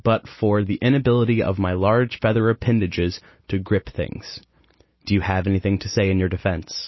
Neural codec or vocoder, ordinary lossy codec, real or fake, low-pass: none; MP3, 24 kbps; real; 7.2 kHz